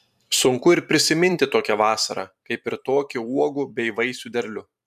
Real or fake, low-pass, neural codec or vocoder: fake; 14.4 kHz; vocoder, 44.1 kHz, 128 mel bands every 512 samples, BigVGAN v2